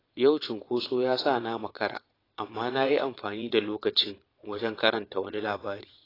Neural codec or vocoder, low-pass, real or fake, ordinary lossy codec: vocoder, 22.05 kHz, 80 mel bands, Vocos; 5.4 kHz; fake; AAC, 24 kbps